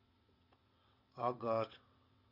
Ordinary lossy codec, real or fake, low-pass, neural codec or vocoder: Opus, 64 kbps; fake; 5.4 kHz; vocoder, 22.05 kHz, 80 mel bands, WaveNeXt